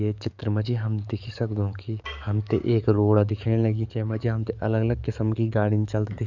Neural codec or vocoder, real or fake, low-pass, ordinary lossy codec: codec, 44.1 kHz, 7.8 kbps, DAC; fake; 7.2 kHz; none